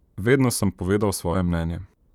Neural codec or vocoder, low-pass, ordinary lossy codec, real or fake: vocoder, 44.1 kHz, 128 mel bands, Pupu-Vocoder; 19.8 kHz; none; fake